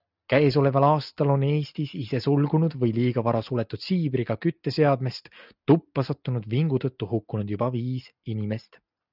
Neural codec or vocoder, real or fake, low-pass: none; real; 5.4 kHz